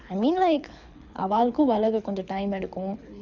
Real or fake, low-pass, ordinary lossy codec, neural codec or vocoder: fake; 7.2 kHz; none; codec, 24 kHz, 6 kbps, HILCodec